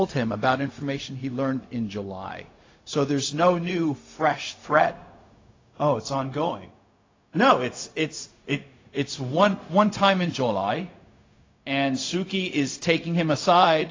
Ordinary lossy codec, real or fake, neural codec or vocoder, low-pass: AAC, 32 kbps; fake; codec, 16 kHz, 0.4 kbps, LongCat-Audio-Codec; 7.2 kHz